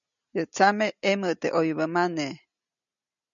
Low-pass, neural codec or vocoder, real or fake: 7.2 kHz; none; real